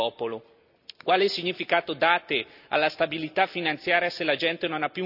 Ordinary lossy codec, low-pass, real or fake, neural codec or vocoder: none; 5.4 kHz; real; none